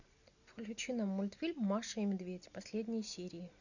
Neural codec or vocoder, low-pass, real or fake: none; 7.2 kHz; real